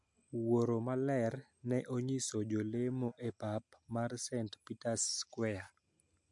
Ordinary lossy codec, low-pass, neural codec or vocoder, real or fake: MP3, 64 kbps; 10.8 kHz; none; real